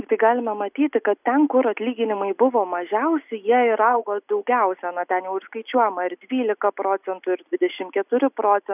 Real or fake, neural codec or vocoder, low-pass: real; none; 3.6 kHz